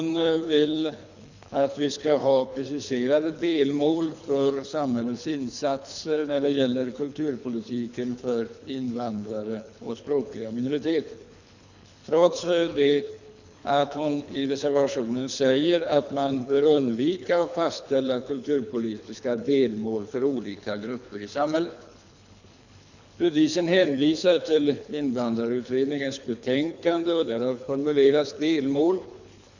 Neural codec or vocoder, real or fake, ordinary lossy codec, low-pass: codec, 24 kHz, 3 kbps, HILCodec; fake; none; 7.2 kHz